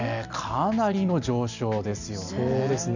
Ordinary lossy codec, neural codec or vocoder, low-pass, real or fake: none; none; 7.2 kHz; real